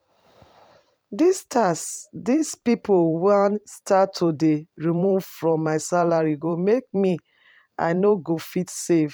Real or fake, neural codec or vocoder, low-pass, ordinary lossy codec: fake; vocoder, 44.1 kHz, 128 mel bands every 512 samples, BigVGAN v2; 19.8 kHz; none